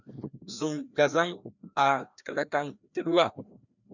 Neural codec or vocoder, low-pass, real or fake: codec, 16 kHz, 1 kbps, FreqCodec, larger model; 7.2 kHz; fake